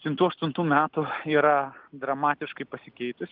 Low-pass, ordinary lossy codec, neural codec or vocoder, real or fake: 5.4 kHz; Opus, 32 kbps; none; real